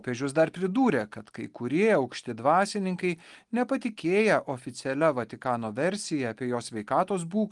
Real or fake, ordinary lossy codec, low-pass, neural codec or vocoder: real; Opus, 24 kbps; 10.8 kHz; none